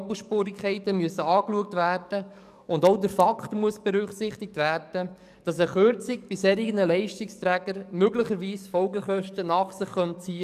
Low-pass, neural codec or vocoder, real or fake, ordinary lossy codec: 14.4 kHz; codec, 44.1 kHz, 7.8 kbps, DAC; fake; none